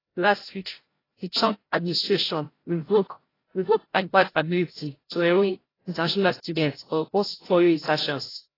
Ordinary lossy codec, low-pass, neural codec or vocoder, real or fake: AAC, 24 kbps; 5.4 kHz; codec, 16 kHz, 0.5 kbps, FreqCodec, larger model; fake